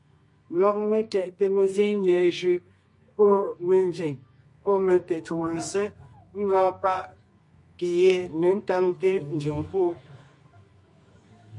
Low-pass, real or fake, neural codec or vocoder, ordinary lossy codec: 10.8 kHz; fake; codec, 24 kHz, 0.9 kbps, WavTokenizer, medium music audio release; MP3, 48 kbps